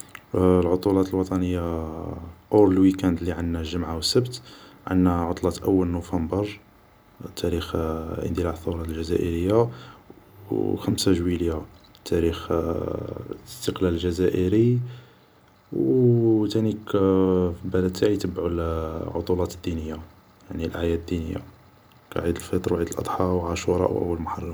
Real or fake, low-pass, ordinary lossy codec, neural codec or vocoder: real; none; none; none